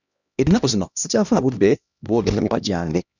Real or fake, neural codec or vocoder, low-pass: fake; codec, 16 kHz, 1 kbps, X-Codec, HuBERT features, trained on LibriSpeech; 7.2 kHz